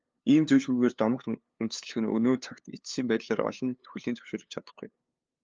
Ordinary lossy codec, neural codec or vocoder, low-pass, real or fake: Opus, 24 kbps; codec, 16 kHz, 2 kbps, FunCodec, trained on LibriTTS, 25 frames a second; 7.2 kHz; fake